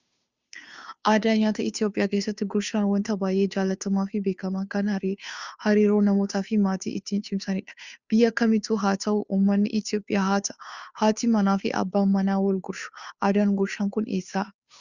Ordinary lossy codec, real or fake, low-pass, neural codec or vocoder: Opus, 64 kbps; fake; 7.2 kHz; codec, 16 kHz, 2 kbps, FunCodec, trained on Chinese and English, 25 frames a second